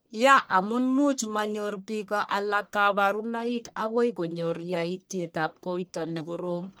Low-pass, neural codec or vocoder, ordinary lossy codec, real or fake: none; codec, 44.1 kHz, 1.7 kbps, Pupu-Codec; none; fake